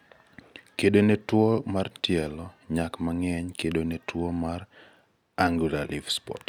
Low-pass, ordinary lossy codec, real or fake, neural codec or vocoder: 19.8 kHz; none; real; none